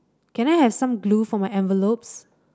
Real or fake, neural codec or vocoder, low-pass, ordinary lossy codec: real; none; none; none